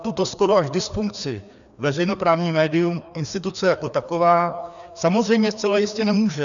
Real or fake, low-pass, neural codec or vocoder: fake; 7.2 kHz; codec, 16 kHz, 2 kbps, FreqCodec, larger model